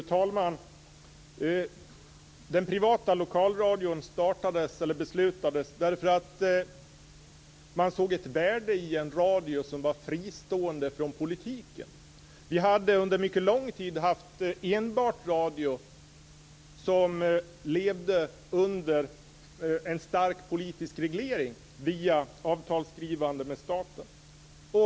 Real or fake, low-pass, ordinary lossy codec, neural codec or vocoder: real; none; none; none